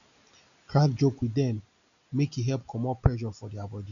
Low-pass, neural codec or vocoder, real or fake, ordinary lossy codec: 7.2 kHz; none; real; none